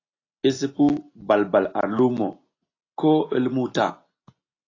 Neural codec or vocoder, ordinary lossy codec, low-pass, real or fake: none; AAC, 32 kbps; 7.2 kHz; real